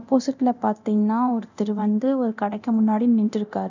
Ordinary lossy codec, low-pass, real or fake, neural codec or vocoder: none; 7.2 kHz; fake; codec, 24 kHz, 0.9 kbps, DualCodec